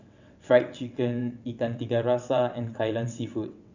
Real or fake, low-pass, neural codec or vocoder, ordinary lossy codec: fake; 7.2 kHz; vocoder, 22.05 kHz, 80 mel bands, WaveNeXt; none